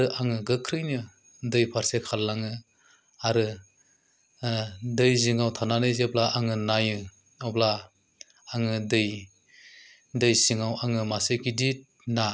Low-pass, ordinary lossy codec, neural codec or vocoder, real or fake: none; none; none; real